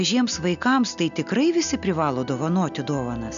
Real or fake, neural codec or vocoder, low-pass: real; none; 7.2 kHz